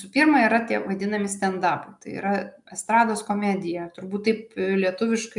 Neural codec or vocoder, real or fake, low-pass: none; real; 10.8 kHz